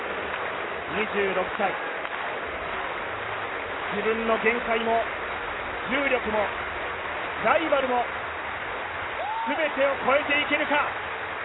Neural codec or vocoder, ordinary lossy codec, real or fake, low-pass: none; AAC, 16 kbps; real; 7.2 kHz